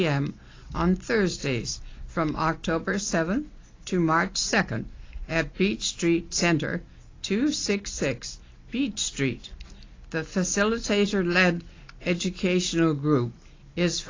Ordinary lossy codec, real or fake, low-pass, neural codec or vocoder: AAC, 32 kbps; real; 7.2 kHz; none